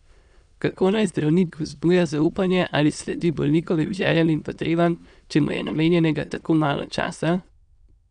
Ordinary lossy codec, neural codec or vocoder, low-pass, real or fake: none; autoencoder, 22.05 kHz, a latent of 192 numbers a frame, VITS, trained on many speakers; 9.9 kHz; fake